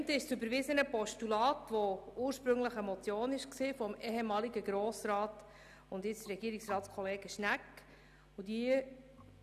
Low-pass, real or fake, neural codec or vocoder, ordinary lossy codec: 14.4 kHz; real; none; none